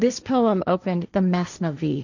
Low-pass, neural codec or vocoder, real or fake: 7.2 kHz; codec, 16 kHz, 1.1 kbps, Voila-Tokenizer; fake